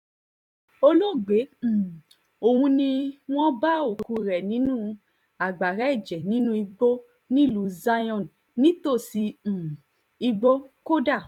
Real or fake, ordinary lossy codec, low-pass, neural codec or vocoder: fake; none; 19.8 kHz; vocoder, 44.1 kHz, 128 mel bands every 256 samples, BigVGAN v2